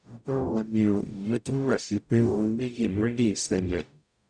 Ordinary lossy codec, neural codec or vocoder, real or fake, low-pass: none; codec, 44.1 kHz, 0.9 kbps, DAC; fake; 9.9 kHz